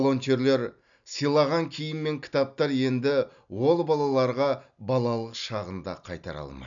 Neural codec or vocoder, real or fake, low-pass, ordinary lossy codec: none; real; 7.2 kHz; none